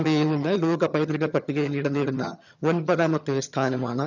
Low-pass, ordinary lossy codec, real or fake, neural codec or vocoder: 7.2 kHz; none; fake; vocoder, 22.05 kHz, 80 mel bands, HiFi-GAN